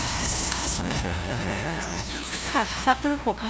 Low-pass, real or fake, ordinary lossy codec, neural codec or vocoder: none; fake; none; codec, 16 kHz, 0.5 kbps, FunCodec, trained on LibriTTS, 25 frames a second